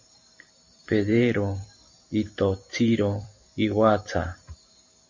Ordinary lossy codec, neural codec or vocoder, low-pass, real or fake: MP3, 64 kbps; vocoder, 44.1 kHz, 128 mel bands every 512 samples, BigVGAN v2; 7.2 kHz; fake